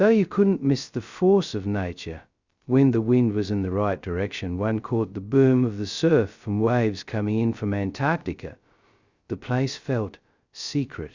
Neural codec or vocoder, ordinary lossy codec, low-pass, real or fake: codec, 16 kHz, 0.2 kbps, FocalCodec; Opus, 64 kbps; 7.2 kHz; fake